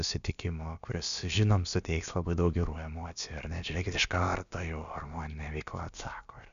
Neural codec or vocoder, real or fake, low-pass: codec, 16 kHz, about 1 kbps, DyCAST, with the encoder's durations; fake; 7.2 kHz